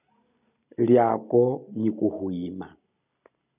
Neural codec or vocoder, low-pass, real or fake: none; 3.6 kHz; real